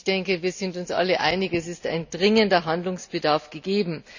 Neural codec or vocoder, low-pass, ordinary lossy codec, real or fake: none; 7.2 kHz; none; real